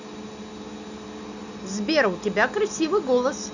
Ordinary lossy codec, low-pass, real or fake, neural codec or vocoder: none; 7.2 kHz; real; none